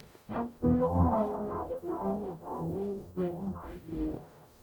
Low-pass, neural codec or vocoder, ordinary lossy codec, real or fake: none; codec, 44.1 kHz, 0.9 kbps, DAC; none; fake